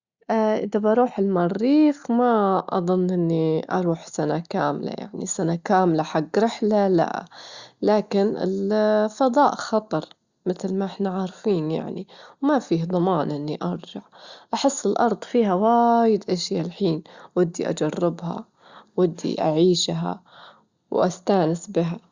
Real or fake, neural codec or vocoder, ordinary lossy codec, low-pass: real; none; Opus, 64 kbps; 7.2 kHz